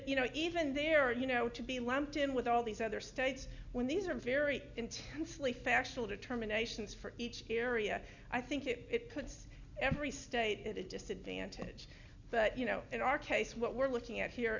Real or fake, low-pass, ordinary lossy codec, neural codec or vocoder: real; 7.2 kHz; Opus, 64 kbps; none